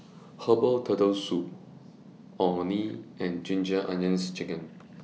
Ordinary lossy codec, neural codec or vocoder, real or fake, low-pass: none; none; real; none